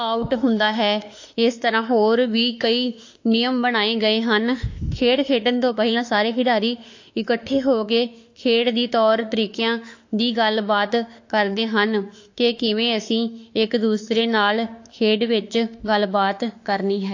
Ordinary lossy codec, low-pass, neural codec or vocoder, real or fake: AAC, 48 kbps; 7.2 kHz; autoencoder, 48 kHz, 32 numbers a frame, DAC-VAE, trained on Japanese speech; fake